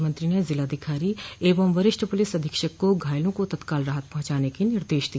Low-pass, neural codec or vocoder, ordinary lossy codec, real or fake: none; none; none; real